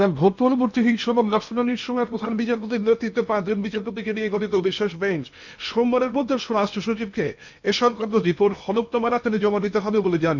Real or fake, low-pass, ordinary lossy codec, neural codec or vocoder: fake; 7.2 kHz; none; codec, 16 kHz in and 24 kHz out, 0.8 kbps, FocalCodec, streaming, 65536 codes